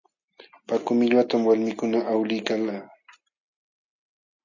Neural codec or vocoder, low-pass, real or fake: none; 7.2 kHz; real